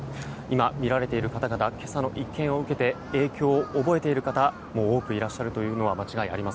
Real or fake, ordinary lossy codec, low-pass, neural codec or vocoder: real; none; none; none